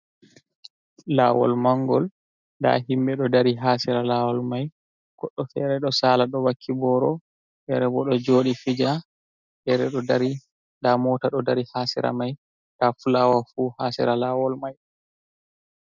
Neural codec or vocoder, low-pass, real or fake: none; 7.2 kHz; real